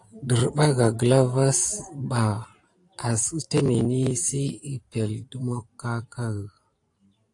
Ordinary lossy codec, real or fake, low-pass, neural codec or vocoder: AAC, 48 kbps; fake; 10.8 kHz; vocoder, 44.1 kHz, 128 mel bands every 512 samples, BigVGAN v2